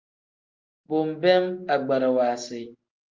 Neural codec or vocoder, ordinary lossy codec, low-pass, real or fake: none; Opus, 24 kbps; 7.2 kHz; real